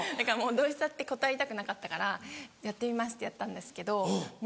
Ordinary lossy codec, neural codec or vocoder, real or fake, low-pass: none; none; real; none